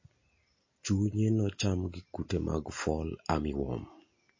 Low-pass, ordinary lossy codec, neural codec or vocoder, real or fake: 7.2 kHz; MP3, 32 kbps; none; real